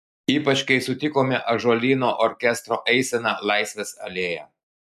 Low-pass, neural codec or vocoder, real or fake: 14.4 kHz; none; real